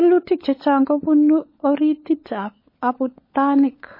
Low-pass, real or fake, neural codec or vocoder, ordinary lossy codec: 5.4 kHz; fake; codec, 16 kHz, 16 kbps, FunCodec, trained on LibriTTS, 50 frames a second; MP3, 24 kbps